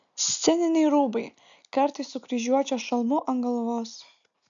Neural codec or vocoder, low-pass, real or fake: none; 7.2 kHz; real